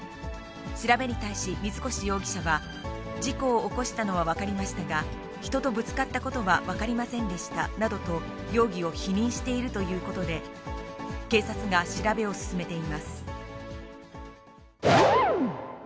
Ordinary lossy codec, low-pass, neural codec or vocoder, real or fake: none; none; none; real